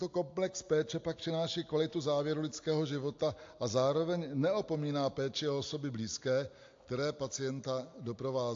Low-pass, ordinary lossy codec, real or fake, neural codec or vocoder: 7.2 kHz; AAC, 48 kbps; real; none